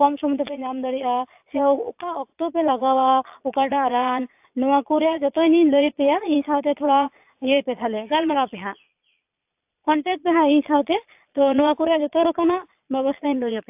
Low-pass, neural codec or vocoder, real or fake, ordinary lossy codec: 3.6 kHz; vocoder, 22.05 kHz, 80 mel bands, Vocos; fake; AAC, 32 kbps